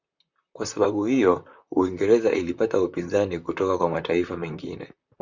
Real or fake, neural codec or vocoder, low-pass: fake; vocoder, 44.1 kHz, 128 mel bands, Pupu-Vocoder; 7.2 kHz